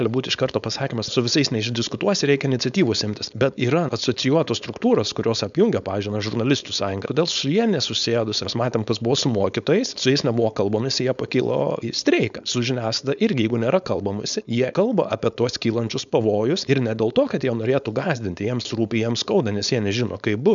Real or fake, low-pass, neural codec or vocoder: fake; 7.2 kHz; codec, 16 kHz, 4.8 kbps, FACodec